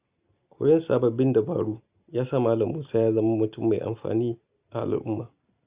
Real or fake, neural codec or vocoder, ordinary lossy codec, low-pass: real; none; Opus, 64 kbps; 3.6 kHz